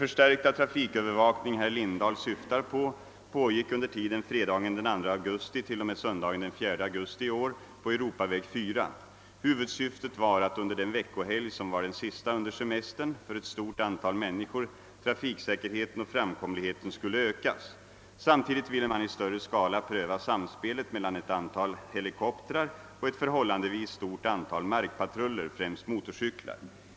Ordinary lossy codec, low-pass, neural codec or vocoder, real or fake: none; none; none; real